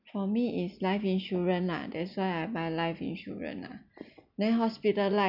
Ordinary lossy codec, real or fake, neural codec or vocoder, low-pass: none; real; none; 5.4 kHz